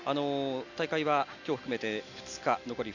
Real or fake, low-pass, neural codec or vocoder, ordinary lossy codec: real; 7.2 kHz; none; none